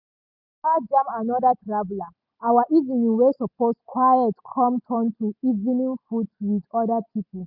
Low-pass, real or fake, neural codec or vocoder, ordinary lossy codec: 5.4 kHz; real; none; none